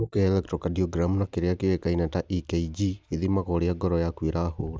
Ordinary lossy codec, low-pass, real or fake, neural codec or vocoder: none; none; real; none